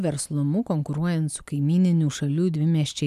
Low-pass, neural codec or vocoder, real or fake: 14.4 kHz; none; real